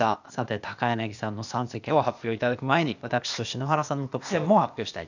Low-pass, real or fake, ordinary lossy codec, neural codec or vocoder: 7.2 kHz; fake; none; codec, 16 kHz, 0.8 kbps, ZipCodec